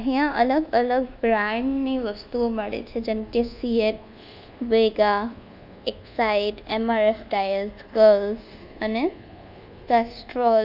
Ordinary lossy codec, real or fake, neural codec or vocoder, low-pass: none; fake; codec, 24 kHz, 1.2 kbps, DualCodec; 5.4 kHz